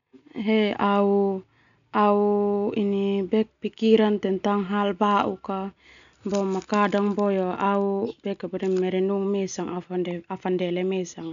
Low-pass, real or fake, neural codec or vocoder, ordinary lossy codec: 7.2 kHz; real; none; none